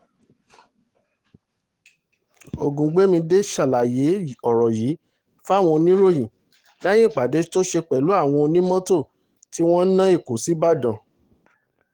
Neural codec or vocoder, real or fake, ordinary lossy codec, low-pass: codec, 44.1 kHz, 7.8 kbps, DAC; fake; Opus, 24 kbps; 19.8 kHz